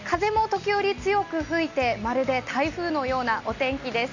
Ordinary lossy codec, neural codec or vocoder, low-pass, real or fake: none; none; 7.2 kHz; real